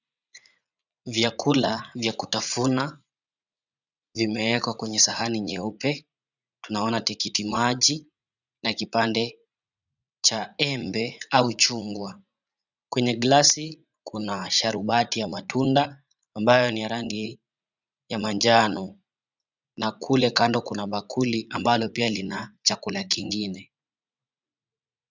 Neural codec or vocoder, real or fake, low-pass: vocoder, 44.1 kHz, 80 mel bands, Vocos; fake; 7.2 kHz